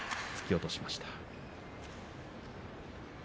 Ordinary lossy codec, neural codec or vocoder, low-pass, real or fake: none; none; none; real